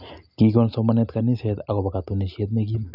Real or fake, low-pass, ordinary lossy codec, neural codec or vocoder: real; 5.4 kHz; none; none